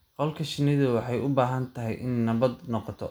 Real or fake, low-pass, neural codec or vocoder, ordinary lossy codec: real; none; none; none